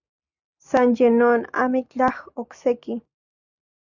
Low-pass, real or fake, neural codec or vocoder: 7.2 kHz; real; none